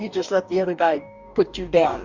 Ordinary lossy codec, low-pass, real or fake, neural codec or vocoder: Opus, 64 kbps; 7.2 kHz; fake; codec, 44.1 kHz, 2.6 kbps, DAC